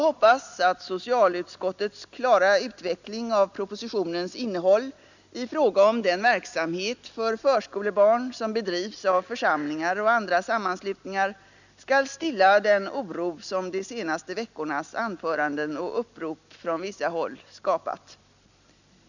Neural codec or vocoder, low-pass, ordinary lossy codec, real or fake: none; 7.2 kHz; none; real